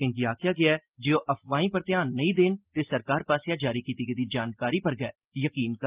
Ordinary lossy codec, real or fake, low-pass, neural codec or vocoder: Opus, 32 kbps; real; 3.6 kHz; none